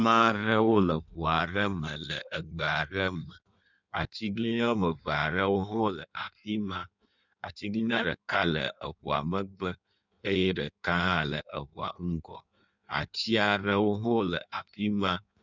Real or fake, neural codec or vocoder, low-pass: fake; codec, 16 kHz in and 24 kHz out, 1.1 kbps, FireRedTTS-2 codec; 7.2 kHz